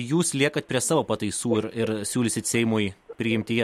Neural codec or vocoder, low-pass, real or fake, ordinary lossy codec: none; 19.8 kHz; real; MP3, 64 kbps